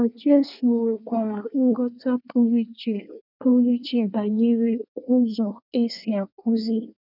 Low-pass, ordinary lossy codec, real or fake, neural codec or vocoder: 5.4 kHz; none; fake; codec, 24 kHz, 1 kbps, SNAC